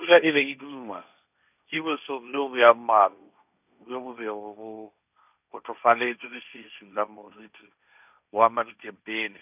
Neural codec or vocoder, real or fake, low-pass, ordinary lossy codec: codec, 16 kHz, 1.1 kbps, Voila-Tokenizer; fake; 3.6 kHz; none